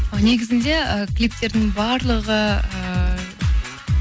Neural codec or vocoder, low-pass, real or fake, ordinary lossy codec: none; none; real; none